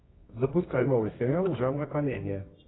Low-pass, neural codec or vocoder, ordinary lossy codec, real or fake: 7.2 kHz; codec, 24 kHz, 0.9 kbps, WavTokenizer, medium music audio release; AAC, 16 kbps; fake